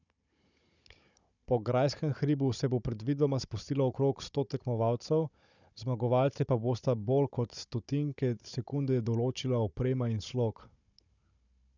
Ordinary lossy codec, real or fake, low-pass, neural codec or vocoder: none; fake; 7.2 kHz; codec, 16 kHz, 16 kbps, FunCodec, trained on Chinese and English, 50 frames a second